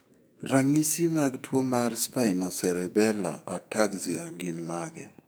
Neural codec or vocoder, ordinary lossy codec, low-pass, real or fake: codec, 44.1 kHz, 2.6 kbps, SNAC; none; none; fake